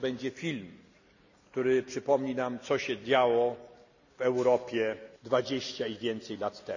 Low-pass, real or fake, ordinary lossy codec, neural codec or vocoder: 7.2 kHz; real; none; none